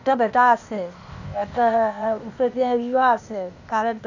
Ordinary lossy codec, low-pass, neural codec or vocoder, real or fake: none; 7.2 kHz; codec, 16 kHz, 0.8 kbps, ZipCodec; fake